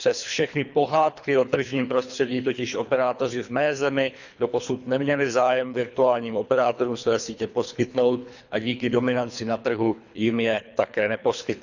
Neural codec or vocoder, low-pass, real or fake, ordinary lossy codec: codec, 24 kHz, 3 kbps, HILCodec; 7.2 kHz; fake; none